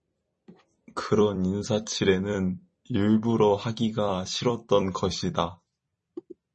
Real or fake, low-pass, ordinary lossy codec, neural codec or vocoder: fake; 10.8 kHz; MP3, 32 kbps; vocoder, 44.1 kHz, 128 mel bands every 256 samples, BigVGAN v2